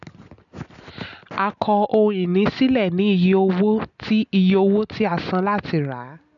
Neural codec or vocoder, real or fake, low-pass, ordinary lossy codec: none; real; 7.2 kHz; none